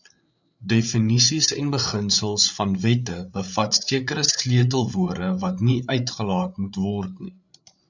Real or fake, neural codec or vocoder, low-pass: fake; codec, 16 kHz, 8 kbps, FreqCodec, larger model; 7.2 kHz